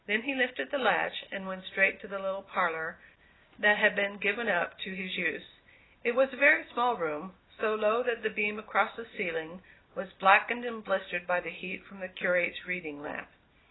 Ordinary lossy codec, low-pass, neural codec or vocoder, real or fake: AAC, 16 kbps; 7.2 kHz; none; real